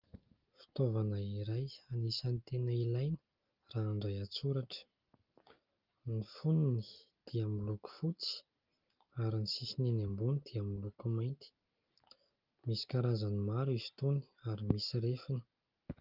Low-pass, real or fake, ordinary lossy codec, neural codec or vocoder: 5.4 kHz; real; Opus, 32 kbps; none